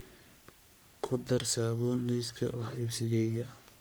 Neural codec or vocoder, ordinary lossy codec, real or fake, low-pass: codec, 44.1 kHz, 3.4 kbps, Pupu-Codec; none; fake; none